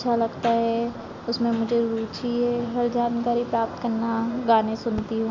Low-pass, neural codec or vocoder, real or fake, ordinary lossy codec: 7.2 kHz; none; real; MP3, 48 kbps